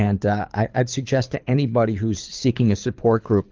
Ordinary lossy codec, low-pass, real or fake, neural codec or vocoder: Opus, 24 kbps; 7.2 kHz; fake; codec, 24 kHz, 6 kbps, HILCodec